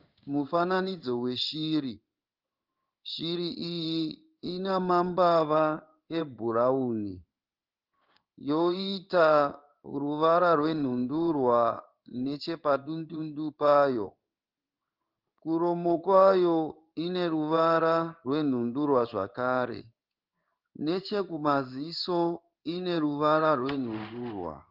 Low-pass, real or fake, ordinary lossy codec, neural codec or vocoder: 5.4 kHz; fake; Opus, 24 kbps; codec, 16 kHz in and 24 kHz out, 1 kbps, XY-Tokenizer